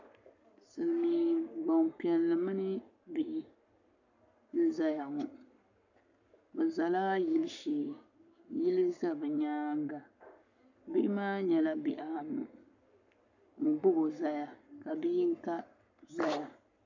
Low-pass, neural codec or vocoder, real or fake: 7.2 kHz; codec, 44.1 kHz, 7.8 kbps, Pupu-Codec; fake